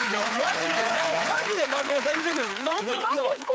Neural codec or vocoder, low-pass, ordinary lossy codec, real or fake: codec, 16 kHz, 4 kbps, FreqCodec, larger model; none; none; fake